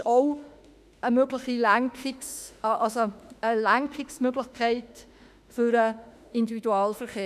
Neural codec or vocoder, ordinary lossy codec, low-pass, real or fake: autoencoder, 48 kHz, 32 numbers a frame, DAC-VAE, trained on Japanese speech; none; 14.4 kHz; fake